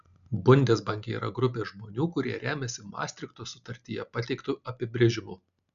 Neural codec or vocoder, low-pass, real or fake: none; 7.2 kHz; real